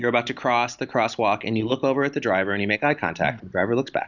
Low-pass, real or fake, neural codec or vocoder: 7.2 kHz; real; none